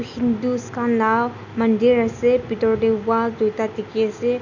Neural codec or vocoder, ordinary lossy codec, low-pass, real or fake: none; none; 7.2 kHz; real